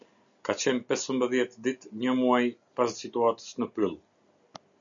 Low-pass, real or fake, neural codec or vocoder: 7.2 kHz; real; none